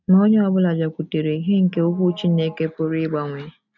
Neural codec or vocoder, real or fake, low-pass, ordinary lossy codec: none; real; 7.2 kHz; none